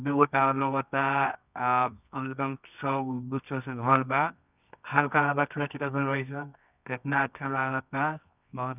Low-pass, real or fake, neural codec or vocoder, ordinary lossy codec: 3.6 kHz; fake; codec, 24 kHz, 0.9 kbps, WavTokenizer, medium music audio release; none